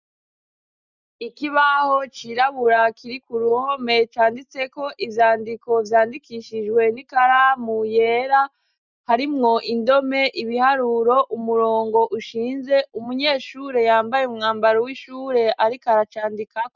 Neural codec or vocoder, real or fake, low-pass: none; real; 7.2 kHz